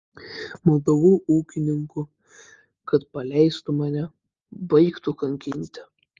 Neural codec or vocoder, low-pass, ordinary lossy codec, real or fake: none; 7.2 kHz; Opus, 32 kbps; real